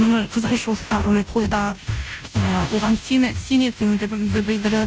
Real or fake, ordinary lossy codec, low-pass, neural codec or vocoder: fake; none; none; codec, 16 kHz, 0.5 kbps, FunCodec, trained on Chinese and English, 25 frames a second